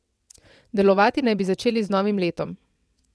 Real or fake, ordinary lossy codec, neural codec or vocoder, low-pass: fake; none; vocoder, 22.05 kHz, 80 mel bands, WaveNeXt; none